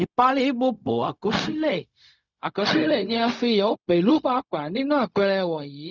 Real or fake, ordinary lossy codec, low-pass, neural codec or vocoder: fake; none; 7.2 kHz; codec, 16 kHz, 0.4 kbps, LongCat-Audio-Codec